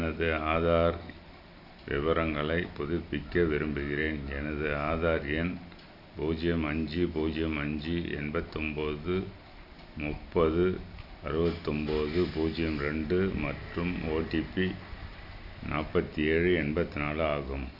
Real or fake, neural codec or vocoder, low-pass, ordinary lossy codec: real; none; 5.4 kHz; none